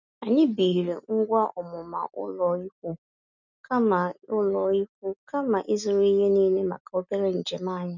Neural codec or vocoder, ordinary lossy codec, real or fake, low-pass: none; none; real; 7.2 kHz